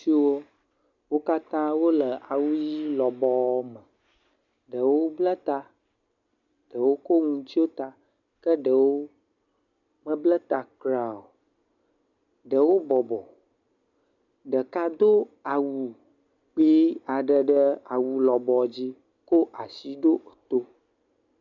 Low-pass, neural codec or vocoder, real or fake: 7.2 kHz; none; real